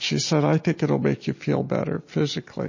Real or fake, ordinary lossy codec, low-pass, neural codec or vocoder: real; MP3, 32 kbps; 7.2 kHz; none